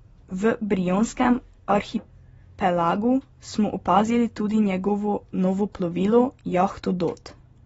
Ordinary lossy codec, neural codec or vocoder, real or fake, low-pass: AAC, 24 kbps; none; real; 19.8 kHz